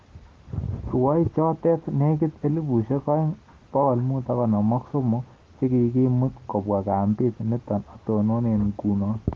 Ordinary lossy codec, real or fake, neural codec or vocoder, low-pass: Opus, 16 kbps; real; none; 7.2 kHz